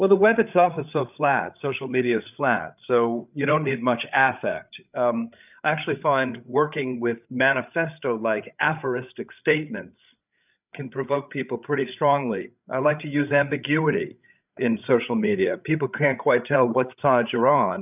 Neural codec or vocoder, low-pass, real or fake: codec, 16 kHz, 8 kbps, FreqCodec, larger model; 3.6 kHz; fake